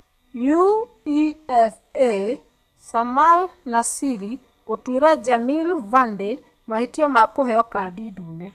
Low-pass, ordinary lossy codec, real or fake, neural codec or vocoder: 14.4 kHz; none; fake; codec, 32 kHz, 1.9 kbps, SNAC